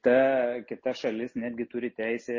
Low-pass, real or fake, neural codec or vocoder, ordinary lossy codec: 7.2 kHz; real; none; MP3, 32 kbps